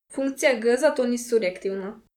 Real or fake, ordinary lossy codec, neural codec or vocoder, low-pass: fake; none; vocoder, 44.1 kHz, 128 mel bands, Pupu-Vocoder; 19.8 kHz